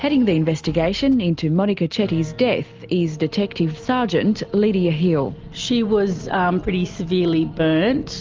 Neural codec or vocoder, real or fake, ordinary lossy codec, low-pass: none; real; Opus, 32 kbps; 7.2 kHz